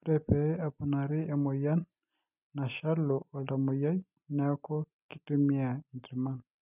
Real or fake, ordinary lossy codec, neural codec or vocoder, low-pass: real; none; none; 3.6 kHz